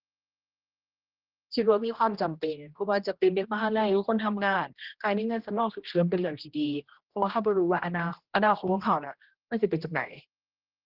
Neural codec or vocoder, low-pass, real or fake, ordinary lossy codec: codec, 16 kHz, 1 kbps, X-Codec, HuBERT features, trained on general audio; 5.4 kHz; fake; Opus, 16 kbps